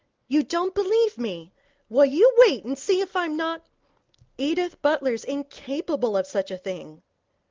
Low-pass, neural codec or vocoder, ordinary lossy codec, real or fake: 7.2 kHz; none; Opus, 32 kbps; real